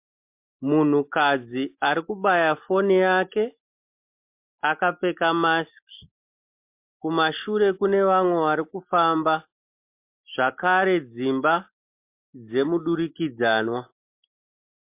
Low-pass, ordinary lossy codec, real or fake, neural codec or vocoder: 3.6 kHz; MP3, 32 kbps; real; none